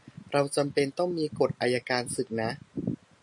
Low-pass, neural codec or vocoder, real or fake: 10.8 kHz; none; real